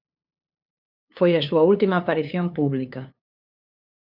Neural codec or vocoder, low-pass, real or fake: codec, 16 kHz, 2 kbps, FunCodec, trained on LibriTTS, 25 frames a second; 5.4 kHz; fake